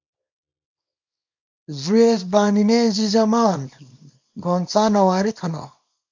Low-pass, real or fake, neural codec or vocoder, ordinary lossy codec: 7.2 kHz; fake; codec, 24 kHz, 0.9 kbps, WavTokenizer, small release; MP3, 48 kbps